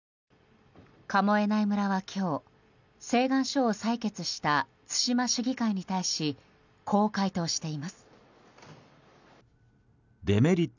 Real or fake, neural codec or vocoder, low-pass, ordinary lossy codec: real; none; 7.2 kHz; none